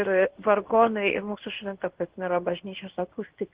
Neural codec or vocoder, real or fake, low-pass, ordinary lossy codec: codec, 16 kHz in and 24 kHz out, 1 kbps, XY-Tokenizer; fake; 3.6 kHz; Opus, 16 kbps